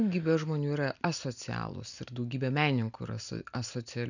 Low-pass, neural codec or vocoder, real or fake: 7.2 kHz; none; real